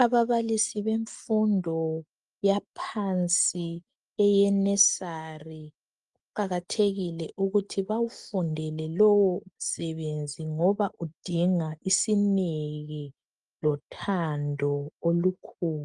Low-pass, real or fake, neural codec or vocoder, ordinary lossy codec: 10.8 kHz; real; none; Opus, 32 kbps